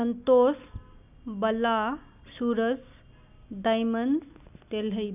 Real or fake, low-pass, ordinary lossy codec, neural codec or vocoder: real; 3.6 kHz; none; none